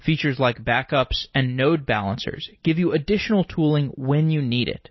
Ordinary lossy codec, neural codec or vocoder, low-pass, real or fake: MP3, 24 kbps; none; 7.2 kHz; real